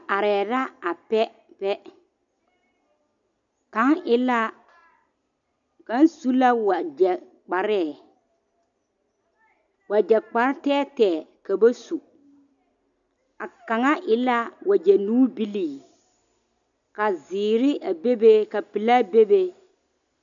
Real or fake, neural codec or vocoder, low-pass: real; none; 7.2 kHz